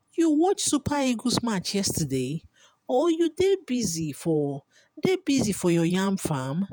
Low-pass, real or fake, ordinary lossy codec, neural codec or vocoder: none; fake; none; vocoder, 48 kHz, 128 mel bands, Vocos